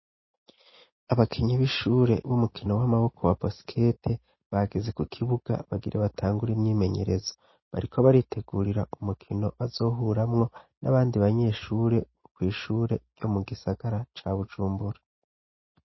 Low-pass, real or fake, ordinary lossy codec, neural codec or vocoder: 7.2 kHz; real; MP3, 24 kbps; none